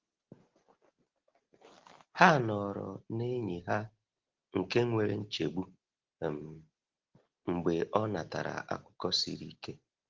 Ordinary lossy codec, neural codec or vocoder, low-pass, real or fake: Opus, 16 kbps; none; 7.2 kHz; real